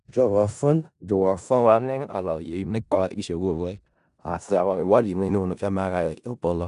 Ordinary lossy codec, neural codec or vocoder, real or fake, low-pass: none; codec, 16 kHz in and 24 kHz out, 0.4 kbps, LongCat-Audio-Codec, four codebook decoder; fake; 10.8 kHz